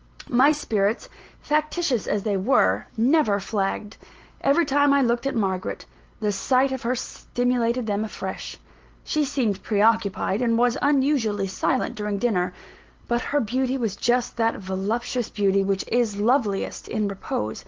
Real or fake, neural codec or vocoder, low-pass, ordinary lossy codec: real; none; 7.2 kHz; Opus, 16 kbps